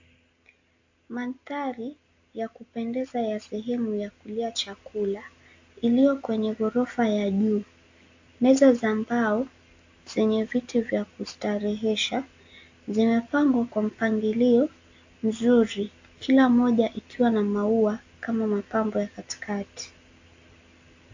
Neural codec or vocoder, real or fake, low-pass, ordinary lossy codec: none; real; 7.2 kHz; AAC, 48 kbps